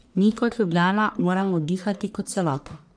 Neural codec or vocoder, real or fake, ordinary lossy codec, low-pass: codec, 44.1 kHz, 1.7 kbps, Pupu-Codec; fake; none; 9.9 kHz